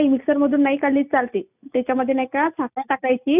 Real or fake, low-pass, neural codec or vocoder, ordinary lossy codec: real; 3.6 kHz; none; none